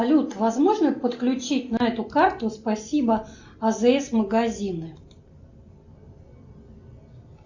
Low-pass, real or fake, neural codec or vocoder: 7.2 kHz; real; none